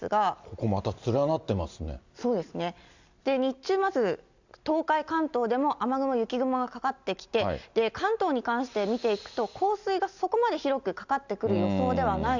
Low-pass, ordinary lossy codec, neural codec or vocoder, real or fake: 7.2 kHz; Opus, 64 kbps; none; real